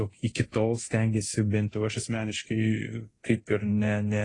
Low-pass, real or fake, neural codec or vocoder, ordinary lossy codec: 10.8 kHz; fake; codec, 24 kHz, 0.9 kbps, DualCodec; AAC, 32 kbps